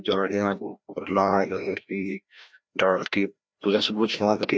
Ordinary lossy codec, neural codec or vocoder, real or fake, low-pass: none; codec, 16 kHz, 1 kbps, FreqCodec, larger model; fake; none